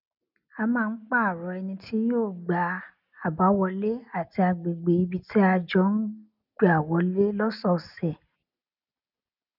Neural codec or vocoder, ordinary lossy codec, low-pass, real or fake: none; none; 5.4 kHz; real